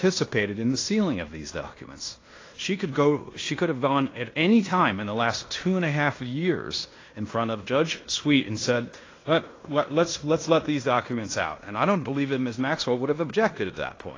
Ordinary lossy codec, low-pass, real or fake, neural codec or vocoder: AAC, 32 kbps; 7.2 kHz; fake; codec, 16 kHz in and 24 kHz out, 0.9 kbps, LongCat-Audio-Codec, fine tuned four codebook decoder